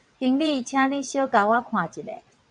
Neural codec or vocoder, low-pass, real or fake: vocoder, 22.05 kHz, 80 mel bands, WaveNeXt; 9.9 kHz; fake